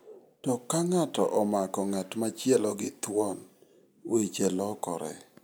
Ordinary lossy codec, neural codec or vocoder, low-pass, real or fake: none; none; none; real